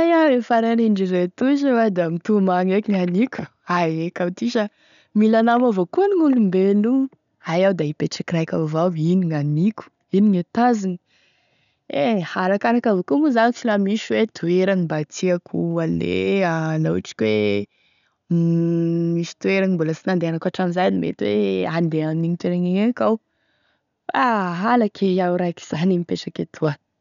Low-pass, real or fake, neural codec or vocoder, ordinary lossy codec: 7.2 kHz; real; none; none